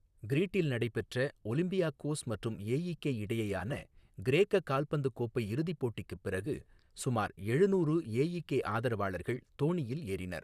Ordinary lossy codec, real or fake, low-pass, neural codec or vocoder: none; fake; 14.4 kHz; vocoder, 44.1 kHz, 128 mel bands, Pupu-Vocoder